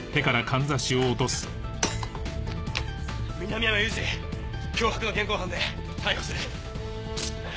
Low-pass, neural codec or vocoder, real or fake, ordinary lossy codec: none; none; real; none